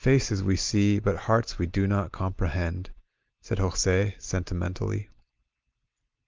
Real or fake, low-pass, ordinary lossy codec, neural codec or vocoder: real; 7.2 kHz; Opus, 32 kbps; none